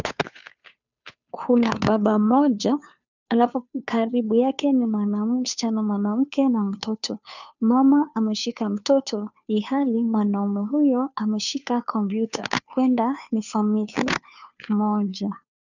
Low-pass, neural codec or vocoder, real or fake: 7.2 kHz; codec, 16 kHz, 2 kbps, FunCodec, trained on Chinese and English, 25 frames a second; fake